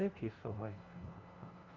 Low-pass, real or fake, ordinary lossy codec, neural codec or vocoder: 7.2 kHz; fake; Opus, 24 kbps; codec, 16 kHz, 0.5 kbps, FunCodec, trained on LibriTTS, 25 frames a second